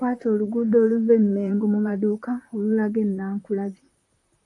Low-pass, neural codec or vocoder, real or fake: 10.8 kHz; vocoder, 44.1 kHz, 128 mel bands, Pupu-Vocoder; fake